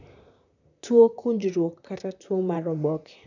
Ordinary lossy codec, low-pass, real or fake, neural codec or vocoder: none; 7.2 kHz; fake; vocoder, 44.1 kHz, 128 mel bands, Pupu-Vocoder